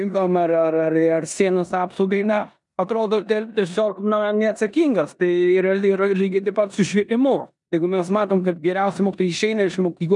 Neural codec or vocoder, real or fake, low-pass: codec, 16 kHz in and 24 kHz out, 0.9 kbps, LongCat-Audio-Codec, four codebook decoder; fake; 10.8 kHz